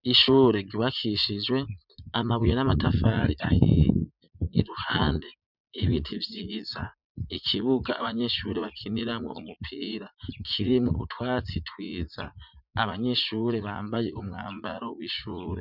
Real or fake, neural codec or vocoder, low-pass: fake; vocoder, 44.1 kHz, 80 mel bands, Vocos; 5.4 kHz